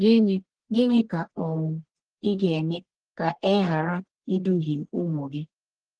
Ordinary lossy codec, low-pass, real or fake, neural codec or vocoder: Opus, 16 kbps; 9.9 kHz; fake; codec, 44.1 kHz, 1.7 kbps, Pupu-Codec